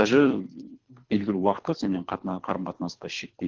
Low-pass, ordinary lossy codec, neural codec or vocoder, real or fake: 7.2 kHz; Opus, 16 kbps; codec, 24 kHz, 3 kbps, HILCodec; fake